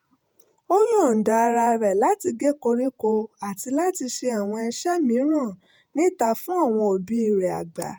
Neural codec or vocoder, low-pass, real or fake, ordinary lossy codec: vocoder, 48 kHz, 128 mel bands, Vocos; none; fake; none